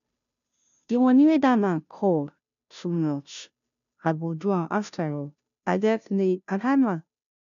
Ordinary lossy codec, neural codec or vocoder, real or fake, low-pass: none; codec, 16 kHz, 0.5 kbps, FunCodec, trained on Chinese and English, 25 frames a second; fake; 7.2 kHz